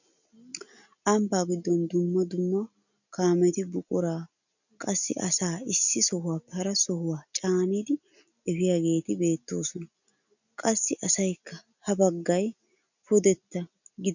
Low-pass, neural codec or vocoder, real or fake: 7.2 kHz; none; real